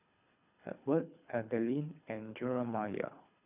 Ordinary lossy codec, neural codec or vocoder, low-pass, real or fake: none; codec, 24 kHz, 3 kbps, HILCodec; 3.6 kHz; fake